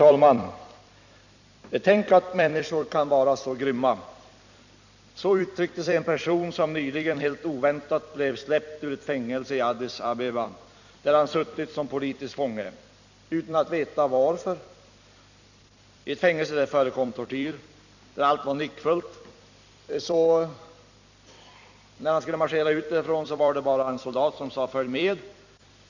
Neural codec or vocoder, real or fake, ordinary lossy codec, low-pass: vocoder, 44.1 kHz, 128 mel bands every 256 samples, BigVGAN v2; fake; none; 7.2 kHz